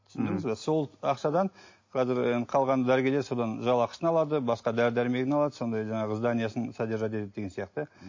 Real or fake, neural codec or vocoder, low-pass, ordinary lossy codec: real; none; 7.2 kHz; MP3, 32 kbps